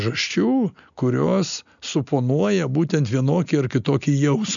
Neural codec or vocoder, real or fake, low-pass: none; real; 7.2 kHz